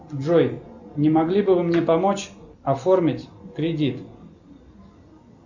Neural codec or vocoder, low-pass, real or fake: none; 7.2 kHz; real